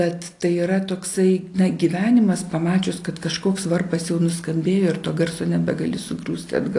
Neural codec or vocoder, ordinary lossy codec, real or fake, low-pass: none; AAC, 48 kbps; real; 10.8 kHz